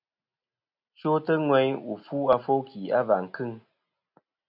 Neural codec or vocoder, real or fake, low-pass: none; real; 5.4 kHz